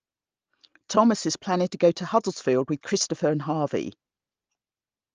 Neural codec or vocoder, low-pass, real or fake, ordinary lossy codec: none; 7.2 kHz; real; Opus, 24 kbps